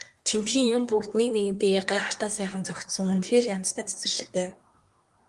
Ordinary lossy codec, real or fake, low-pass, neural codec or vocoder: Opus, 32 kbps; fake; 10.8 kHz; codec, 24 kHz, 1 kbps, SNAC